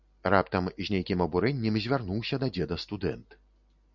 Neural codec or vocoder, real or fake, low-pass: none; real; 7.2 kHz